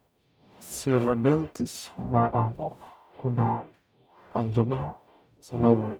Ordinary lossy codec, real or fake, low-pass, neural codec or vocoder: none; fake; none; codec, 44.1 kHz, 0.9 kbps, DAC